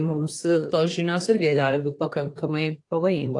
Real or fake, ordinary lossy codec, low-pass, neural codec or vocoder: fake; AAC, 48 kbps; 10.8 kHz; codec, 24 kHz, 1 kbps, SNAC